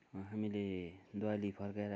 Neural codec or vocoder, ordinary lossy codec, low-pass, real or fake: none; none; none; real